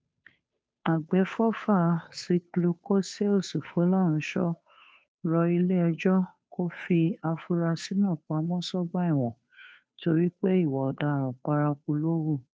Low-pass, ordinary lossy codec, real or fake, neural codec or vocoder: none; none; fake; codec, 16 kHz, 2 kbps, FunCodec, trained on Chinese and English, 25 frames a second